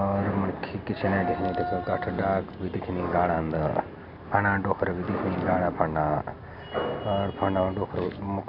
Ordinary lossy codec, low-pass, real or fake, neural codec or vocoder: none; 5.4 kHz; real; none